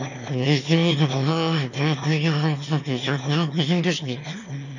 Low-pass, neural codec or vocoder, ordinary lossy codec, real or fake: 7.2 kHz; autoencoder, 22.05 kHz, a latent of 192 numbers a frame, VITS, trained on one speaker; none; fake